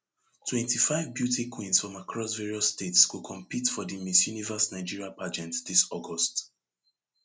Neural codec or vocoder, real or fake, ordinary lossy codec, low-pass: none; real; none; none